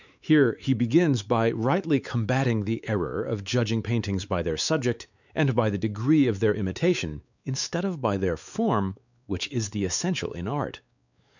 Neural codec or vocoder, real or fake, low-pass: codec, 16 kHz, 4 kbps, X-Codec, WavLM features, trained on Multilingual LibriSpeech; fake; 7.2 kHz